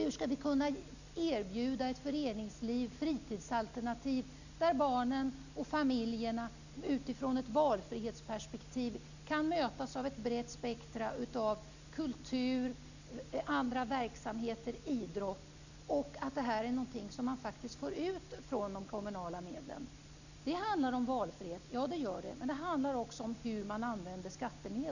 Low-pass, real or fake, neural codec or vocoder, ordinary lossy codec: 7.2 kHz; real; none; none